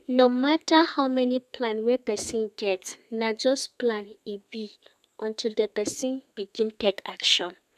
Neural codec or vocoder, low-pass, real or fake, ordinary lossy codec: codec, 32 kHz, 1.9 kbps, SNAC; 14.4 kHz; fake; none